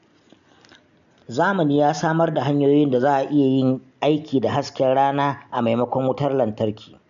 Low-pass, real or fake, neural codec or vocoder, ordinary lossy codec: 7.2 kHz; real; none; none